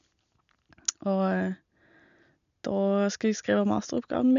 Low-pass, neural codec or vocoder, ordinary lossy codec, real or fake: 7.2 kHz; none; none; real